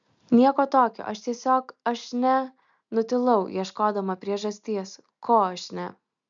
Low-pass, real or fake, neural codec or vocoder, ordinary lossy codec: 7.2 kHz; real; none; MP3, 96 kbps